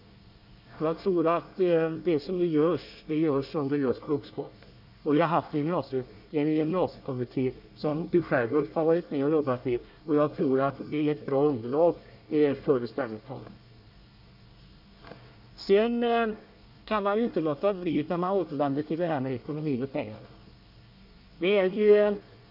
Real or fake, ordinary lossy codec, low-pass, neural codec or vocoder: fake; none; 5.4 kHz; codec, 24 kHz, 1 kbps, SNAC